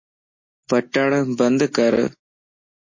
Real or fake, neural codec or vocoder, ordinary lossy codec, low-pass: real; none; MP3, 32 kbps; 7.2 kHz